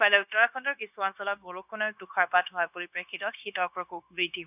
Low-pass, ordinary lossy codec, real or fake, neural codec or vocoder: 3.6 kHz; none; fake; codec, 16 kHz, 0.9 kbps, LongCat-Audio-Codec